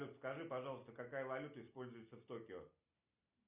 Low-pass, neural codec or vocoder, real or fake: 3.6 kHz; none; real